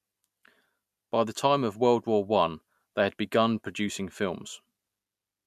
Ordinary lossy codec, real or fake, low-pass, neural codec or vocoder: MP3, 96 kbps; real; 14.4 kHz; none